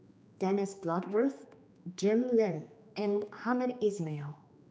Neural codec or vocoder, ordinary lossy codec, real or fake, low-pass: codec, 16 kHz, 2 kbps, X-Codec, HuBERT features, trained on general audio; none; fake; none